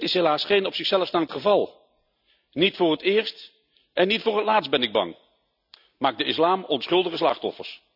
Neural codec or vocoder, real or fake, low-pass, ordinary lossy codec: none; real; 5.4 kHz; none